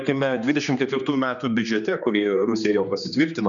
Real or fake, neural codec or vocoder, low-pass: fake; codec, 16 kHz, 2 kbps, X-Codec, HuBERT features, trained on general audio; 7.2 kHz